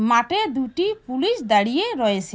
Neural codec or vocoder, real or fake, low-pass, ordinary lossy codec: none; real; none; none